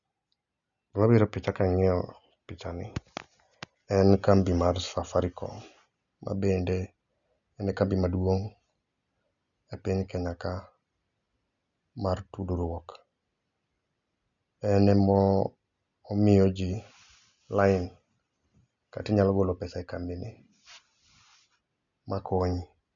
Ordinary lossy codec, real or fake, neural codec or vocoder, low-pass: none; real; none; 7.2 kHz